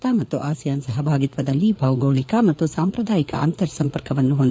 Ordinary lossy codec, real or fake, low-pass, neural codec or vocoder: none; fake; none; codec, 16 kHz, 4 kbps, FreqCodec, larger model